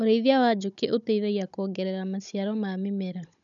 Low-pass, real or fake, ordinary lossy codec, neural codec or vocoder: 7.2 kHz; fake; none; codec, 16 kHz, 16 kbps, FunCodec, trained on Chinese and English, 50 frames a second